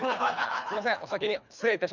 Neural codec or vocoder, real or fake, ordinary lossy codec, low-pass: codec, 24 kHz, 3 kbps, HILCodec; fake; none; 7.2 kHz